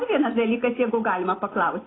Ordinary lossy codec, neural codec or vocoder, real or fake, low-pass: AAC, 16 kbps; none; real; 7.2 kHz